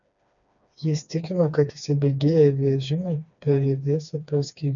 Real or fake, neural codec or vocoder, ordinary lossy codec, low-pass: fake; codec, 16 kHz, 2 kbps, FreqCodec, smaller model; MP3, 96 kbps; 7.2 kHz